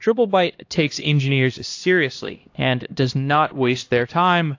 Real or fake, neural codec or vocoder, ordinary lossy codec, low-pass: fake; codec, 16 kHz, 1 kbps, X-Codec, HuBERT features, trained on LibriSpeech; AAC, 48 kbps; 7.2 kHz